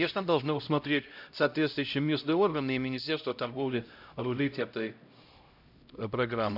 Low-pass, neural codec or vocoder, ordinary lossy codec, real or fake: 5.4 kHz; codec, 16 kHz, 0.5 kbps, X-Codec, HuBERT features, trained on LibriSpeech; none; fake